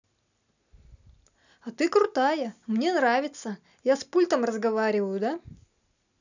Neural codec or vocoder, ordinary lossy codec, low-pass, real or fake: none; none; 7.2 kHz; real